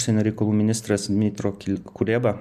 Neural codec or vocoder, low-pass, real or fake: none; 14.4 kHz; real